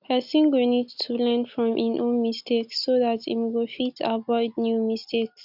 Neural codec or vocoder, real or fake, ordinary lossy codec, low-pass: none; real; none; 5.4 kHz